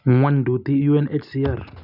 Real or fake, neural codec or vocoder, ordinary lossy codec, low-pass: real; none; none; 5.4 kHz